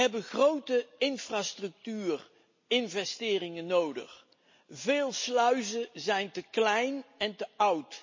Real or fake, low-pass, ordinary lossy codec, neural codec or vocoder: real; 7.2 kHz; MP3, 32 kbps; none